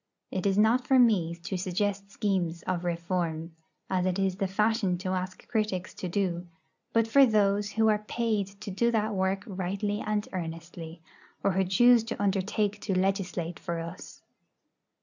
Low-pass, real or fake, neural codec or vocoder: 7.2 kHz; real; none